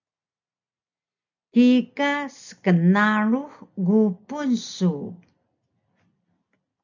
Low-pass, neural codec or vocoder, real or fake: 7.2 kHz; none; real